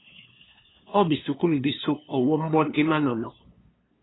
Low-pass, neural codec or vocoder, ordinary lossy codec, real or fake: 7.2 kHz; codec, 16 kHz, 2 kbps, FunCodec, trained on LibriTTS, 25 frames a second; AAC, 16 kbps; fake